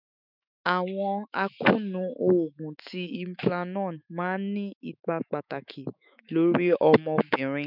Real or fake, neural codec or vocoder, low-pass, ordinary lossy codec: fake; autoencoder, 48 kHz, 128 numbers a frame, DAC-VAE, trained on Japanese speech; 5.4 kHz; none